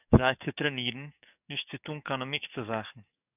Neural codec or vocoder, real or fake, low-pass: codec, 44.1 kHz, 7.8 kbps, DAC; fake; 3.6 kHz